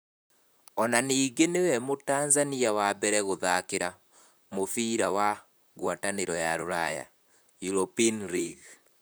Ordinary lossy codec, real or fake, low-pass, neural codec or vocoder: none; fake; none; vocoder, 44.1 kHz, 128 mel bands, Pupu-Vocoder